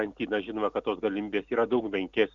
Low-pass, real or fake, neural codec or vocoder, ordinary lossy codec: 7.2 kHz; real; none; Opus, 64 kbps